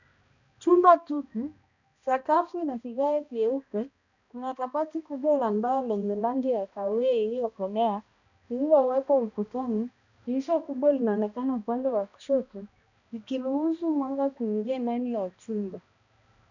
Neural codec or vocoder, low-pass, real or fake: codec, 16 kHz, 1 kbps, X-Codec, HuBERT features, trained on balanced general audio; 7.2 kHz; fake